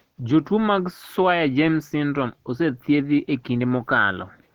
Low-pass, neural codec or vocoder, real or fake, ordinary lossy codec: 19.8 kHz; none; real; Opus, 16 kbps